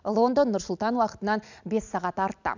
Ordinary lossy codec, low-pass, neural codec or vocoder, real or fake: none; 7.2 kHz; none; real